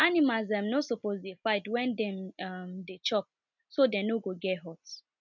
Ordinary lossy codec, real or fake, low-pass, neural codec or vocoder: none; real; 7.2 kHz; none